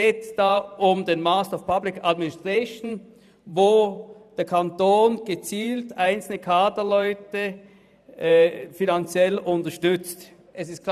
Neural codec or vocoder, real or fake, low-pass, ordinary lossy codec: vocoder, 44.1 kHz, 128 mel bands every 512 samples, BigVGAN v2; fake; 14.4 kHz; none